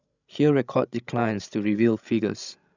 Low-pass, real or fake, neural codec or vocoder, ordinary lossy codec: 7.2 kHz; fake; codec, 16 kHz, 16 kbps, FreqCodec, larger model; none